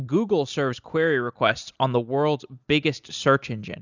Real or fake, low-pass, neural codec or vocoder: real; 7.2 kHz; none